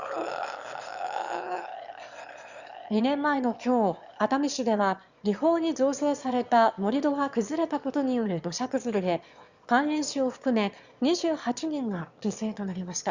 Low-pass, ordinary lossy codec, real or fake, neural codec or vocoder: 7.2 kHz; Opus, 64 kbps; fake; autoencoder, 22.05 kHz, a latent of 192 numbers a frame, VITS, trained on one speaker